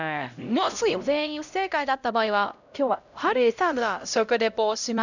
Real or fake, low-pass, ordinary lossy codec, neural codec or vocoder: fake; 7.2 kHz; none; codec, 16 kHz, 0.5 kbps, X-Codec, HuBERT features, trained on LibriSpeech